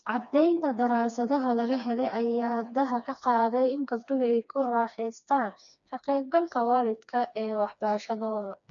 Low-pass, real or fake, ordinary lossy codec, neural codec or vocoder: 7.2 kHz; fake; none; codec, 16 kHz, 2 kbps, FreqCodec, smaller model